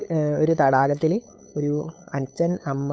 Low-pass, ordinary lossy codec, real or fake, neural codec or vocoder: none; none; fake; codec, 16 kHz, 8 kbps, FunCodec, trained on LibriTTS, 25 frames a second